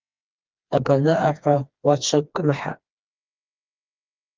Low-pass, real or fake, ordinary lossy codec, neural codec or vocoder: 7.2 kHz; fake; Opus, 24 kbps; codec, 16 kHz, 2 kbps, FreqCodec, smaller model